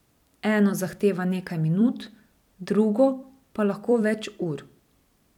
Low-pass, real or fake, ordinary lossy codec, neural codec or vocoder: 19.8 kHz; fake; none; vocoder, 44.1 kHz, 128 mel bands every 256 samples, BigVGAN v2